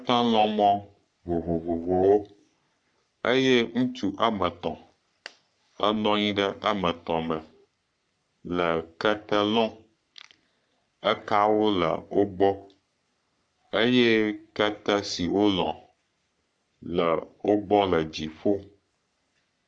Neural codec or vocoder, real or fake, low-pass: codec, 44.1 kHz, 3.4 kbps, Pupu-Codec; fake; 9.9 kHz